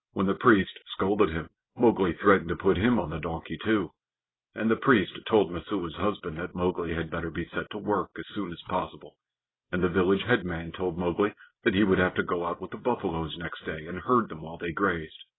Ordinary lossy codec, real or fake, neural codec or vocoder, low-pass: AAC, 16 kbps; real; none; 7.2 kHz